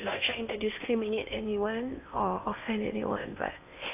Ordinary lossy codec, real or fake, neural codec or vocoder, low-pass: AAC, 32 kbps; fake; codec, 16 kHz in and 24 kHz out, 0.8 kbps, FocalCodec, streaming, 65536 codes; 3.6 kHz